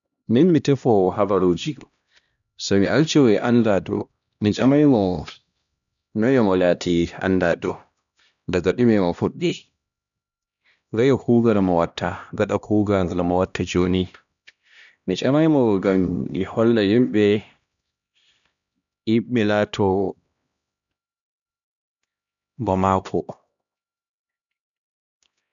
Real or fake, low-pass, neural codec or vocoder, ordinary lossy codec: fake; 7.2 kHz; codec, 16 kHz, 1 kbps, X-Codec, HuBERT features, trained on LibriSpeech; none